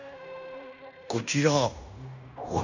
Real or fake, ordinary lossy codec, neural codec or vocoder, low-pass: fake; none; codec, 16 kHz in and 24 kHz out, 0.9 kbps, LongCat-Audio-Codec, fine tuned four codebook decoder; 7.2 kHz